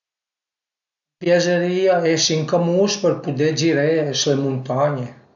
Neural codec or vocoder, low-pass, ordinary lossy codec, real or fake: none; 7.2 kHz; none; real